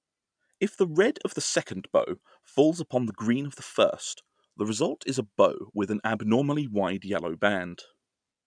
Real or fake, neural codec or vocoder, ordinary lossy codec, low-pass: real; none; none; 9.9 kHz